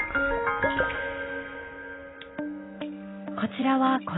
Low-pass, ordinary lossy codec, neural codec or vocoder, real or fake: 7.2 kHz; AAC, 16 kbps; none; real